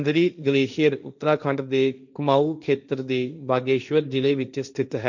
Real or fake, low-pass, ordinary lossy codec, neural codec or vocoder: fake; 7.2 kHz; none; codec, 16 kHz, 1.1 kbps, Voila-Tokenizer